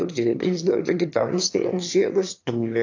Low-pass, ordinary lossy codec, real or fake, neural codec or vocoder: 7.2 kHz; AAC, 48 kbps; fake; autoencoder, 22.05 kHz, a latent of 192 numbers a frame, VITS, trained on one speaker